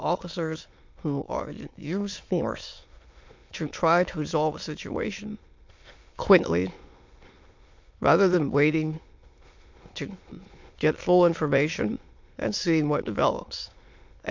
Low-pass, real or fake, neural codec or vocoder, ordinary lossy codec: 7.2 kHz; fake; autoencoder, 22.05 kHz, a latent of 192 numbers a frame, VITS, trained on many speakers; MP3, 48 kbps